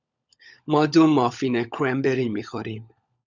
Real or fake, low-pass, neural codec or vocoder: fake; 7.2 kHz; codec, 16 kHz, 16 kbps, FunCodec, trained on LibriTTS, 50 frames a second